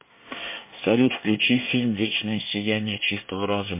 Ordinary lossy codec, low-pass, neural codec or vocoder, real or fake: MP3, 24 kbps; 3.6 kHz; codec, 24 kHz, 1 kbps, SNAC; fake